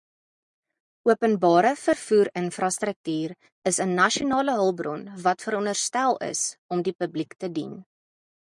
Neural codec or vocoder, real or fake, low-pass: none; real; 10.8 kHz